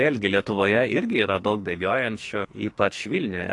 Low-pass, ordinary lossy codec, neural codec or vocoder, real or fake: 10.8 kHz; AAC, 48 kbps; codec, 44.1 kHz, 2.6 kbps, SNAC; fake